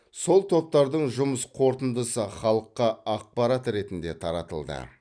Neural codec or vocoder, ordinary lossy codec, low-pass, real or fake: none; none; 9.9 kHz; real